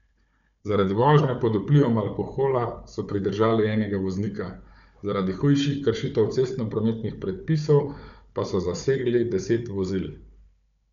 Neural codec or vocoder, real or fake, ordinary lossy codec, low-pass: codec, 16 kHz, 4 kbps, FunCodec, trained on Chinese and English, 50 frames a second; fake; none; 7.2 kHz